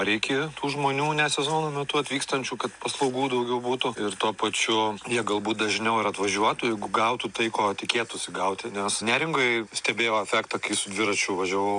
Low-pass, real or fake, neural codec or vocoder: 9.9 kHz; real; none